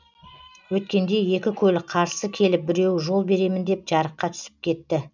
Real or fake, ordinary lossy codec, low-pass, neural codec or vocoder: real; none; 7.2 kHz; none